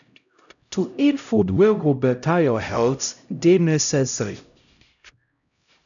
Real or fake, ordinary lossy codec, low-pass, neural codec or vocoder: fake; none; 7.2 kHz; codec, 16 kHz, 0.5 kbps, X-Codec, HuBERT features, trained on LibriSpeech